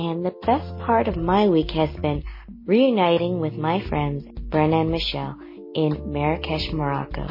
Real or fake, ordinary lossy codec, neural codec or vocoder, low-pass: real; MP3, 24 kbps; none; 5.4 kHz